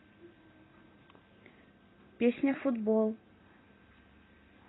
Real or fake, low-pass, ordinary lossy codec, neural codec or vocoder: fake; 7.2 kHz; AAC, 16 kbps; vocoder, 44.1 kHz, 128 mel bands every 256 samples, BigVGAN v2